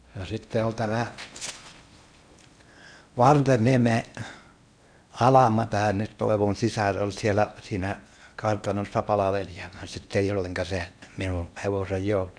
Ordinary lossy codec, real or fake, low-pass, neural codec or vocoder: none; fake; 9.9 kHz; codec, 16 kHz in and 24 kHz out, 0.8 kbps, FocalCodec, streaming, 65536 codes